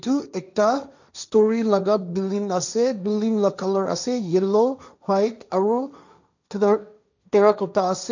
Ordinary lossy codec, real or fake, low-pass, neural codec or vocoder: none; fake; 7.2 kHz; codec, 16 kHz, 1.1 kbps, Voila-Tokenizer